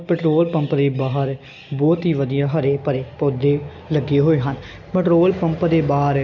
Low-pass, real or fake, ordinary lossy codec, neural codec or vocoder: 7.2 kHz; real; none; none